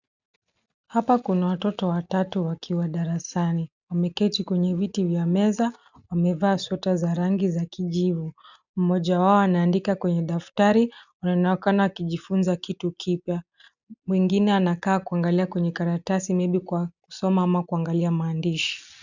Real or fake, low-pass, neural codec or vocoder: real; 7.2 kHz; none